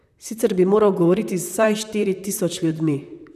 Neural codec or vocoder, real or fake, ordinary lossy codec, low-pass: vocoder, 44.1 kHz, 128 mel bands, Pupu-Vocoder; fake; none; 14.4 kHz